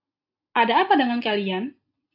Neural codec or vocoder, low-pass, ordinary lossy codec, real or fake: none; 5.4 kHz; AAC, 32 kbps; real